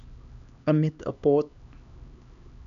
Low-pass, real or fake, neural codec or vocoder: 7.2 kHz; fake; codec, 16 kHz, 2 kbps, X-Codec, HuBERT features, trained on LibriSpeech